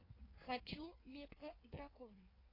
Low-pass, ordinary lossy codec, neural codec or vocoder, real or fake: 5.4 kHz; AAC, 24 kbps; codec, 16 kHz in and 24 kHz out, 1.1 kbps, FireRedTTS-2 codec; fake